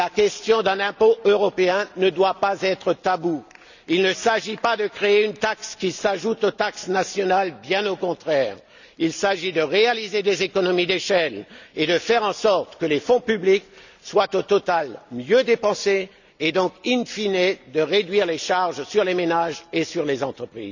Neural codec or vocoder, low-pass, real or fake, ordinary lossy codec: none; 7.2 kHz; real; none